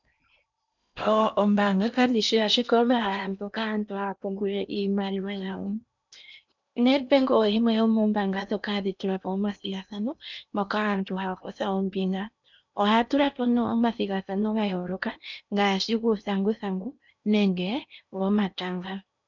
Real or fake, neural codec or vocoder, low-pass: fake; codec, 16 kHz in and 24 kHz out, 0.8 kbps, FocalCodec, streaming, 65536 codes; 7.2 kHz